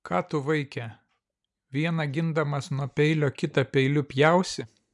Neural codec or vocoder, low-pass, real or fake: none; 10.8 kHz; real